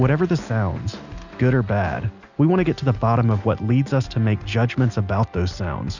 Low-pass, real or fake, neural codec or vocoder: 7.2 kHz; real; none